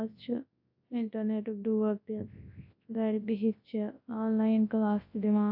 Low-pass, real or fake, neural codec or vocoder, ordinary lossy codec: 5.4 kHz; fake; codec, 24 kHz, 0.9 kbps, WavTokenizer, large speech release; AAC, 48 kbps